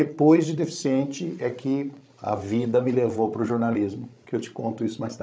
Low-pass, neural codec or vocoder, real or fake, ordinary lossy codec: none; codec, 16 kHz, 16 kbps, FreqCodec, larger model; fake; none